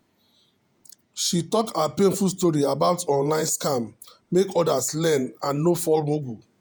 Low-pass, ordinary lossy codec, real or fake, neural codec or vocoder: none; none; fake; vocoder, 48 kHz, 128 mel bands, Vocos